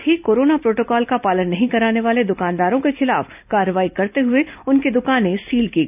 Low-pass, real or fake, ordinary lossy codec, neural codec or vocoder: 3.6 kHz; real; none; none